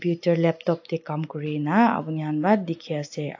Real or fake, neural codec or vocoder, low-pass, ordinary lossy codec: real; none; 7.2 kHz; AAC, 48 kbps